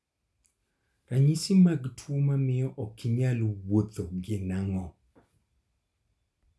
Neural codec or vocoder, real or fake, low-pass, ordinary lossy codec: none; real; none; none